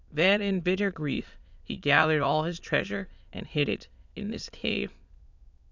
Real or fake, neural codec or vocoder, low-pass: fake; autoencoder, 22.05 kHz, a latent of 192 numbers a frame, VITS, trained on many speakers; 7.2 kHz